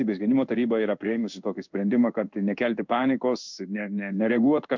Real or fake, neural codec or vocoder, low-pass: fake; codec, 16 kHz in and 24 kHz out, 1 kbps, XY-Tokenizer; 7.2 kHz